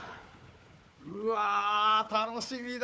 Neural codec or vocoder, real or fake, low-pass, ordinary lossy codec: codec, 16 kHz, 4 kbps, FunCodec, trained on Chinese and English, 50 frames a second; fake; none; none